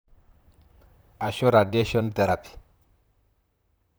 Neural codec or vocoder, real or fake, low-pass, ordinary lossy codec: vocoder, 44.1 kHz, 128 mel bands, Pupu-Vocoder; fake; none; none